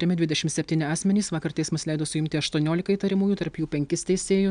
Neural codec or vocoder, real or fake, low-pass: none; real; 9.9 kHz